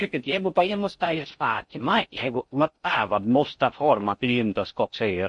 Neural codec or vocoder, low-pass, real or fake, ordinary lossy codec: codec, 16 kHz in and 24 kHz out, 0.6 kbps, FocalCodec, streaming, 4096 codes; 10.8 kHz; fake; MP3, 48 kbps